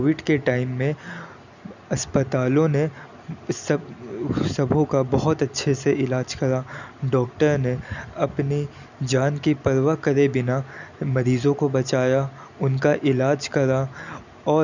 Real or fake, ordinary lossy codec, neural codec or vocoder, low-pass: real; none; none; 7.2 kHz